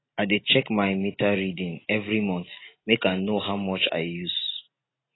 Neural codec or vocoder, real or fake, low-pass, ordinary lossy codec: none; real; 7.2 kHz; AAC, 16 kbps